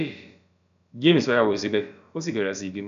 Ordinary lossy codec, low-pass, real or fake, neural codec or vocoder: none; 7.2 kHz; fake; codec, 16 kHz, about 1 kbps, DyCAST, with the encoder's durations